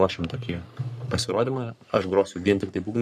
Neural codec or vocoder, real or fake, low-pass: codec, 44.1 kHz, 3.4 kbps, Pupu-Codec; fake; 14.4 kHz